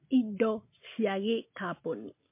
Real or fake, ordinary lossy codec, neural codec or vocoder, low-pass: real; MP3, 24 kbps; none; 3.6 kHz